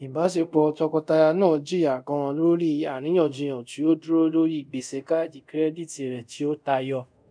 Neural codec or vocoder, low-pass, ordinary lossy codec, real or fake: codec, 24 kHz, 0.5 kbps, DualCodec; 9.9 kHz; none; fake